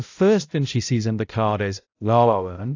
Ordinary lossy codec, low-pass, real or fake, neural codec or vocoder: AAC, 48 kbps; 7.2 kHz; fake; codec, 16 kHz, 0.5 kbps, X-Codec, HuBERT features, trained on balanced general audio